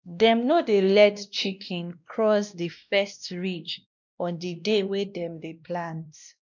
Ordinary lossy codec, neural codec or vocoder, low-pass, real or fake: none; codec, 16 kHz, 1 kbps, X-Codec, WavLM features, trained on Multilingual LibriSpeech; 7.2 kHz; fake